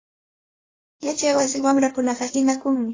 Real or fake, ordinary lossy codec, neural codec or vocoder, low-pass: fake; AAC, 32 kbps; codec, 16 kHz in and 24 kHz out, 1.1 kbps, FireRedTTS-2 codec; 7.2 kHz